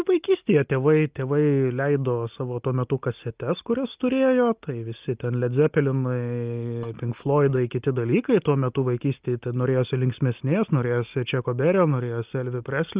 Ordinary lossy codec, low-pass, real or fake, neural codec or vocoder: Opus, 24 kbps; 3.6 kHz; real; none